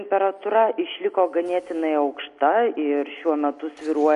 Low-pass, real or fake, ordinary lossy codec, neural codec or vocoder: 14.4 kHz; real; MP3, 48 kbps; none